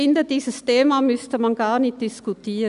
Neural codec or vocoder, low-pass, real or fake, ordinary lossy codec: none; 10.8 kHz; real; none